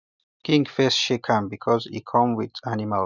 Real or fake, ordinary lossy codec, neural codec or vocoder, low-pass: real; none; none; 7.2 kHz